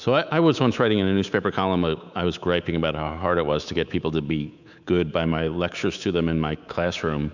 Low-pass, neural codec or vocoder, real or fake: 7.2 kHz; codec, 24 kHz, 3.1 kbps, DualCodec; fake